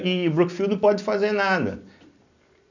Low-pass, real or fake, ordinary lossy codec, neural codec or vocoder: 7.2 kHz; real; none; none